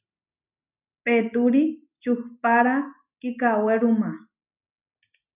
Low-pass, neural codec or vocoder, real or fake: 3.6 kHz; none; real